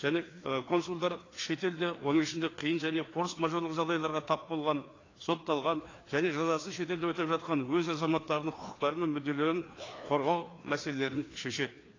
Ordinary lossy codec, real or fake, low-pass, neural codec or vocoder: AAC, 32 kbps; fake; 7.2 kHz; codec, 16 kHz, 2 kbps, FreqCodec, larger model